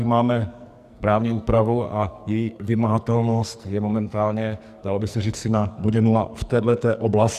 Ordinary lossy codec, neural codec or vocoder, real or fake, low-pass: Opus, 64 kbps; codec, 44.1 kHz, 2.6 kbps, SNAC; fake; 14.4 kHz